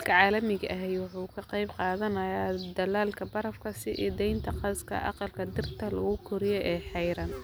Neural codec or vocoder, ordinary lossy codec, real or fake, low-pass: none; none; real; none